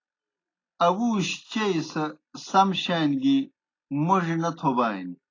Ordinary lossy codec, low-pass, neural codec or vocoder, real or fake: AAC, 32 kbps; 7.2 kHz; none; real